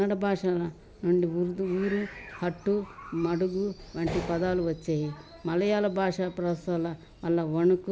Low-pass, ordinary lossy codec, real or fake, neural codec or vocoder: none; none; real; none